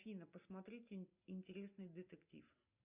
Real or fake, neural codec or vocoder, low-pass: real; none; 3.6 kHz